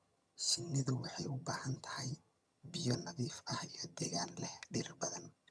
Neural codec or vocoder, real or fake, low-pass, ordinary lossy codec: vocoder, 22.05 kHz, 80 mel bands, HiFi-GAN; fake; none; none